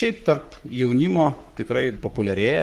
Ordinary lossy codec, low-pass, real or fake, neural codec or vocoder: Opus, 32 kbps; 14.4 kHz; fake; codec, 44.1 kHz, 3.4 kbps, Pupu-Codec